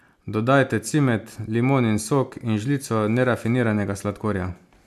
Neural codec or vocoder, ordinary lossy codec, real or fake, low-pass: none; MP3, 96 kbps; real; 14.4 kHz